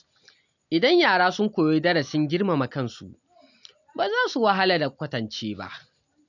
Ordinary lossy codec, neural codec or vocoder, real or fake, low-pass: none; none; real; 7.2 kHz